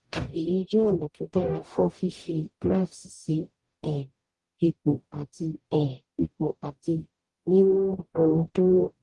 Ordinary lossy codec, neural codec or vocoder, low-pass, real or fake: Opus, 24 kbps; codec, 44.1 kHz, 0.9 kbps, DAC; 10.8 kHz; fake